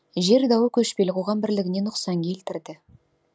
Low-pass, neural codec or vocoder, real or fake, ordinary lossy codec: none; none; real; none